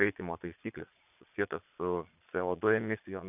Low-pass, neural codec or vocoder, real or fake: 3.6 kHz; vocoder, 22.05 kHz, 80 mel bands, Vocos; fake